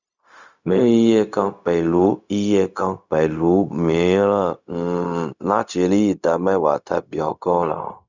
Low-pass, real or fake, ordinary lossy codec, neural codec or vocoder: 7.2 kHz; fake; Opus, 64 kbps; codec, 16 kHz, 0.4 kbps, LongCat-Audio-Codec